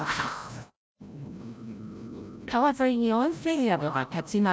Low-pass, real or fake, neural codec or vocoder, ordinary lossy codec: none; fake; codec, 16 kHz, 0.5 kbps, FreqCodec, larger model; none